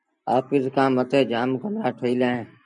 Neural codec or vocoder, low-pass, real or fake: none; 9.9 kHz; real